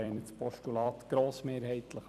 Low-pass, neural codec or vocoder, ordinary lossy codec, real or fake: 14.4 kHz; vocoder, 44.1 kHz, 128 mel bands every 256 samples, BigVGAN v2; none; fake